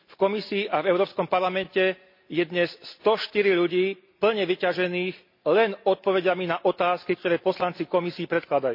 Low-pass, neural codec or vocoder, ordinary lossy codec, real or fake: 5.4 kHz; none; MP3, 32 kbps; real